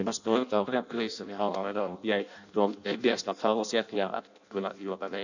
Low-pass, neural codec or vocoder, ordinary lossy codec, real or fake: 7.2 kHz; codec, 16 kHz in and 24 kHz out, 0.6 kbps, FireRedTTS-2 codec; none; fake